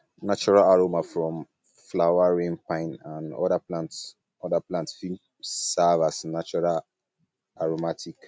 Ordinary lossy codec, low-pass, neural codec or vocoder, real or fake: none; none; none; real